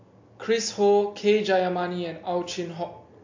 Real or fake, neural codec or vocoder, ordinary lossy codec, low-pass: real; none; MP3, 48 kbps; 7.2 kHz